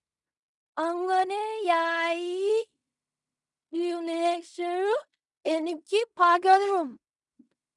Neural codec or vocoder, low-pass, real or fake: codec, 16 kHz in and 24 kHz out, 0.4 kbps, LongCat-Audio-Codec, fine tuned four codebook decoder; 10.8 kHz; fake